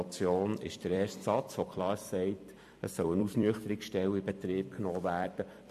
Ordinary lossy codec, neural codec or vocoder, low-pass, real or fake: MP3, 64 kbps; vocoder, 44.1 kHz, 128 mel bands every 256 samples, BigVGAN v2; 14.4 kHz; fake